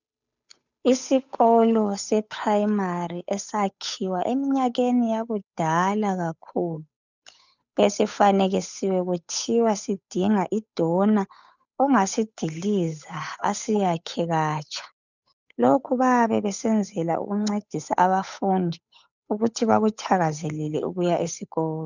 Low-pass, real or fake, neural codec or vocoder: 7.2 kHz; fake; codec, 16 kHz, 8 kbps, FunCodec, trained on Chinese and English, 25 frames a second